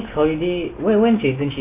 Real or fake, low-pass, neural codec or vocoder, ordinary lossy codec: real; 3.6 kHz; none; MP3, 24 kbps